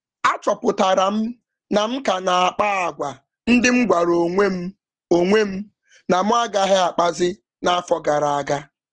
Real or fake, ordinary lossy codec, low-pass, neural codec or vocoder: real; Opus, 16 kbps; 9.9 kHz; none